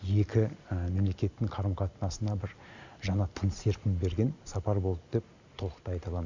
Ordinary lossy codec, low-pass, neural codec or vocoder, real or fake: Opus, 64 kbps; 7.2 kHz; none; real